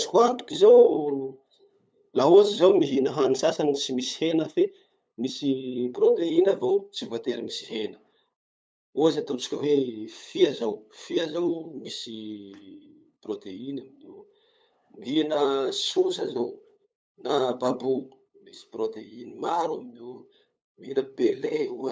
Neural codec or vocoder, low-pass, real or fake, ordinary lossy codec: codec, 16 kHz, 8 kbps, FunCodec, trained on LibriTTS, 25 frames a second; none; fake; none